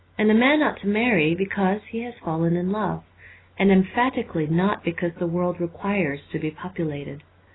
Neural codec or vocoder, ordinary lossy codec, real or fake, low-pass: none; AAC, 16 kbps; real; 7.2 kHz